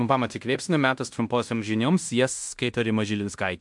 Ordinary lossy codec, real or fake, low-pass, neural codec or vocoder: MP3, 64 kbps; fake; 10.8 kHz; codec, 16 kHz in and 24 kHz out, 0.9 kbps, LongCat-Audio-Codec, fine tuned four codebook decoder